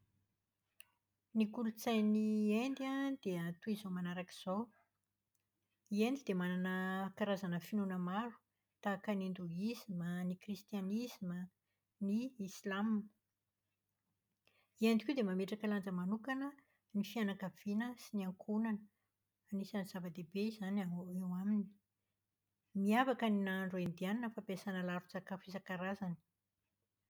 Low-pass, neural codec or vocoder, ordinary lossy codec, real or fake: none; none; none; real